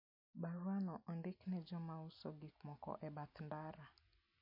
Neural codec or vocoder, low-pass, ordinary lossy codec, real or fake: none; 5.4 kHz; MP3, 32 kbps; real